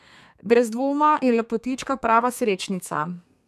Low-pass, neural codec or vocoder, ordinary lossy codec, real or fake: 14.4 kHz; codec, 32 kHz, 1.9 kbps, SNAC; none; fake